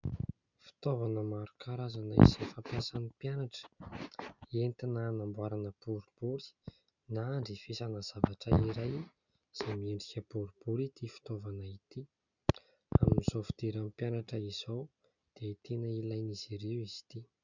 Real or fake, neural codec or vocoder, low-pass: real; none; 7.2 kHz